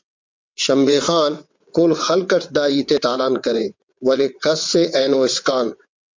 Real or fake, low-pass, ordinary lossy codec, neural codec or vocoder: fake; 7.2 kHz; MP3, 64 kbps; vocoder, 22.05 kHz, 80 mel bands, WaveNeXt